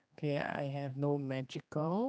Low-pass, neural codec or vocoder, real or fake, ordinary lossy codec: none; codec, 16 kHz, 2 kbps, X-Codec, HuBERT features, trained on general audio; fake; none